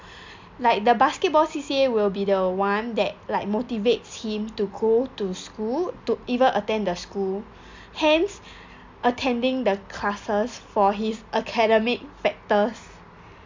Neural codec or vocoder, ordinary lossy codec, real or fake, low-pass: none; MP3, 64 kbps; real; 7.2 kHz